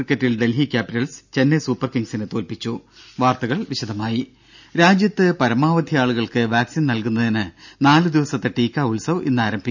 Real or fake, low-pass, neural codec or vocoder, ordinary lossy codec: real; 7.2 kHz; none; none